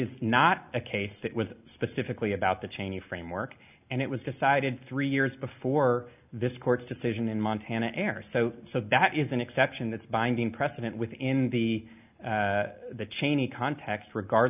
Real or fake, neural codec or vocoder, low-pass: real; none; 3.6 kHz